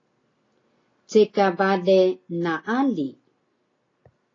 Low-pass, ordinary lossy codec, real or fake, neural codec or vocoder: 7.2 kHz; AAC, 32 kbps; real; none